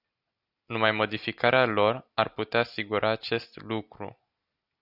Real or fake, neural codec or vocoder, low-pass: real; none; 5.4 kHz